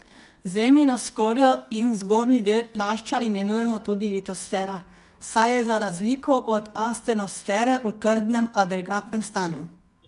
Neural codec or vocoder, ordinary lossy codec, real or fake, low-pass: codec, 24 kHz, 0.9 kbps, WavTokenizer, medium music audio release; none; fake; 10.8 kHz